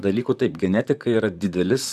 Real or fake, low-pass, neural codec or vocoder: fake; 14.4 kHz; vocoder, 44.1 kHz, 128 mel bands, Pupu-Vocoder